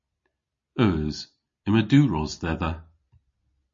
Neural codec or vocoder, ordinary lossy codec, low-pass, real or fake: none; MP3, 32 kbps; 7.2 kHz; real